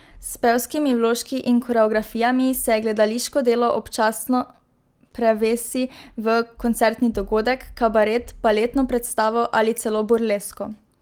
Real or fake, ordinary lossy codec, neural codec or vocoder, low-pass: real; Opus, 24 kbps; none; 19.8 kHz